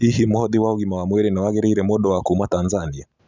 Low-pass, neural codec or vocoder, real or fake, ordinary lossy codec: 7.2 kHz; none; real; none